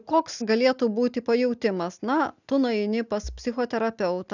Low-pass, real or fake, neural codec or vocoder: 7.2 kHz; real; none